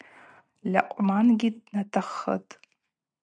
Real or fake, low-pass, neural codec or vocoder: real; 9.9 kHz; none